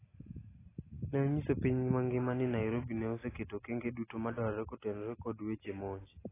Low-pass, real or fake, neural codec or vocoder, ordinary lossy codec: 3.6 kHz; real; none; AAC, 16 kbps